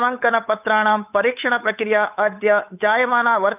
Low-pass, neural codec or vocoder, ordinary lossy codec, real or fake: 3.6 kHz; codec, 16 kHz, 8 kbps, FunCodec, trained on Chinese and English, 25 frames a second; none; fake